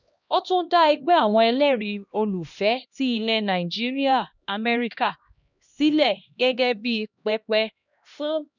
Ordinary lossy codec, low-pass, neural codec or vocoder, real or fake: none; 7.2 kHz; codec, 16 kHz, 1 kbps, X-Codec, HuBERT features, trained on LibriSpeech; fake